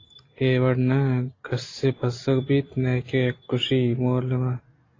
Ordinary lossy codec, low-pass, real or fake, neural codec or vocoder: AAC, 32 kbps; 7.2 kHz; real; none